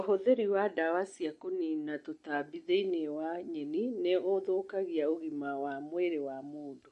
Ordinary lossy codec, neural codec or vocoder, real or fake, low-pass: MP3, 48 kbps; none; real; 14.4 kHz